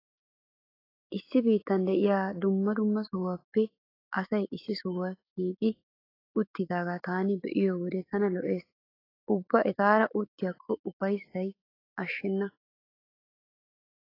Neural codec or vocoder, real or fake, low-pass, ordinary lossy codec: autoencoder, 48 kHz, 128 numbers a frame, DAC-VAE, trained on Japanese speech; fake; 5.4 kHz; AAC, 24 kbps